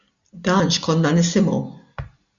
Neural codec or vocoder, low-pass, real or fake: none; 7.2 kHz; real